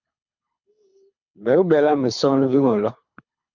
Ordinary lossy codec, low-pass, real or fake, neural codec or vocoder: MP3, 64 kbps; 7.2 kHz; fake; codec, 24 kHz, 3 kbps, HILCodec